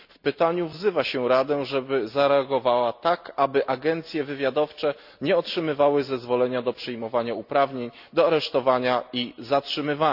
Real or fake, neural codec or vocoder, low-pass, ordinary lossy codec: real; none; 5.4 kHz; none